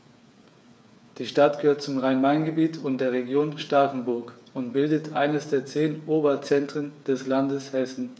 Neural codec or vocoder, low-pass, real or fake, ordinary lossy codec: codec, 16 kHz, 8 kbps, FreqCodec, smaller model; none; fake; none